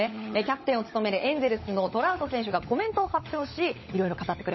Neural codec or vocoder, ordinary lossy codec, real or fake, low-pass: codec, 16 kHz, 16 kbps, FunCodec, trained on LibriTTS, 50 frames a second; MP3, 24 kbps; fake; 7.2 kHz